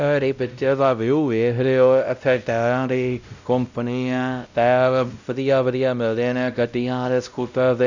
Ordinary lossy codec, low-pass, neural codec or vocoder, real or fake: none; 7.2 kHz; codec, 16 kHz, 0.5 kbps, X-Codec, WavLM features, trained on Multilingual LibriSpeech; fake